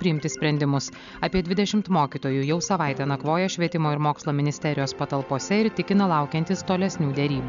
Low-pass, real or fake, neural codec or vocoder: 7.2 kHz; real; none